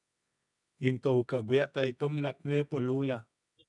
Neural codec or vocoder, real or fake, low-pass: codec, 24 kHz, 0.9 kbps, WavTokenizer, medium music audio release; fake; 10.8 kHz